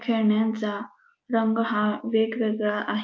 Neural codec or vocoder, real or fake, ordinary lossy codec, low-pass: none; real; none; none